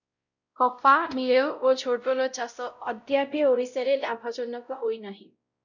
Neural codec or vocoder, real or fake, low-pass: codec, 16 kHz, 0.5 kbps, X-Codec, WavLM features, trained on Multilingual LibriSpeech; fake; 7.2 kHz